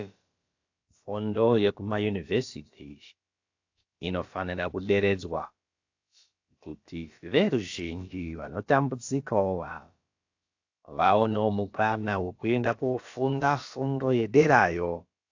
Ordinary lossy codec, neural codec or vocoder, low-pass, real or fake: AAC, 48 kbps; codec, 16 kHz, about 1 kbps, DyCAST, with the encoder's durations; 7.2 kHz; fake